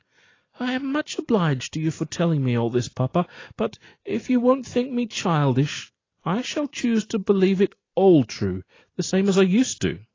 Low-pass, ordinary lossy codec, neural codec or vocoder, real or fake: 7.2 kHz; AAC, 32 kbps; none; real